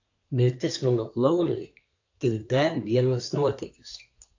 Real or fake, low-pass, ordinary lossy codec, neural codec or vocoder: fake; 7.2 kHz; AAC, 48 kbps; codec, 24 kHz, 1 kbps, SNAC